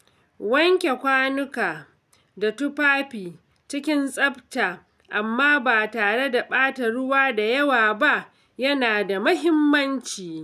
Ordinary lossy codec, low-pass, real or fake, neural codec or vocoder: none; 14.4 kHz; real; none